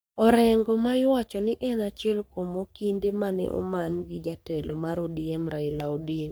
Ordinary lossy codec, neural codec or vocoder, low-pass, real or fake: none; codec, 44.1 kHz, 3.4 kbps, Pupu-Codec; none; fake